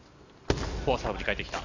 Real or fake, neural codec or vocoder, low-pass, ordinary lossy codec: real; none; 7.2 kHz; none